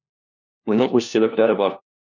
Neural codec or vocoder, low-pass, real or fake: codec, 16 kHz, 1 kbps, FunCodec, trained on LibriTTS, 50 frames a second; 7.2 kHz; fake